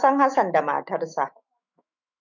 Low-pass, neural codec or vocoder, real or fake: 7.2 kHz; autoencoder, 48 kHz, 128 numbers a frame, DAC-VAE, trained on Japanese speech; fake